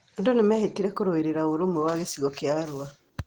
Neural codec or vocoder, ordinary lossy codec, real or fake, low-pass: none; Opus, 16 kbps; real; 19.8 kHz